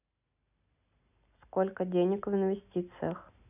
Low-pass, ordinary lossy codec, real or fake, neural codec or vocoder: 3.6 kHz; none; real; none